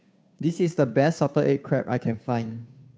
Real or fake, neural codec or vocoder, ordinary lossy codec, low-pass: fake; codec, 16 kHz, 2 kbps, FunCodec, trained on Chinese and English, 25 frames a second; none; none